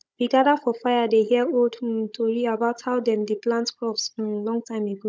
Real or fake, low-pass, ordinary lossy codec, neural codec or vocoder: fake; none; none; codec, 16 kHz, 16 kbps, FunCodec, trained on Chinese and English, 50 frames a second